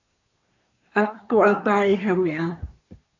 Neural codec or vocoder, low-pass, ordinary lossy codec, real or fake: codec, 24 kHz, 1 kbps, SNAC; 7.2 kHz; AAC, 48 kbps; fake